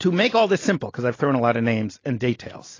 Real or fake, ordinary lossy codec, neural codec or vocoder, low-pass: real; AAC, 32 kbps; none; 7.2 kHz